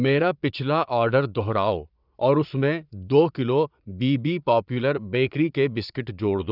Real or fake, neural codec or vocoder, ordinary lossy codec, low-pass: fake; vocoder, 22.05 kHz, 80 mel bands, Vocos; none; 5.4 kHz